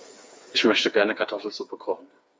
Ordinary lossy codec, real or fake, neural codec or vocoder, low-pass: none; fake; codec, 16 kHz, 4 kbps, FreqCodec, smaller model; none